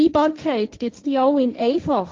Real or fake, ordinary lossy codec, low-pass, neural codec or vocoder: fake; Opus, 32 kbps; 7.2 kHz; codec, 16 kHz, 1.1 kbps, Voila-Tokenizer